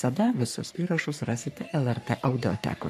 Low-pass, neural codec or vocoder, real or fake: 14.4 kHz; codec, 44.1 kHz, 3.4 kbps, Pupu-Codec; fake